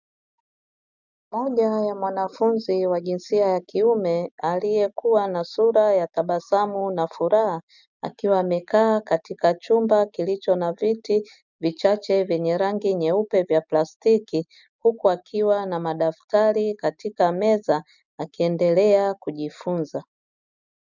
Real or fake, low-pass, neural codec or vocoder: real; 7.2 kHz; none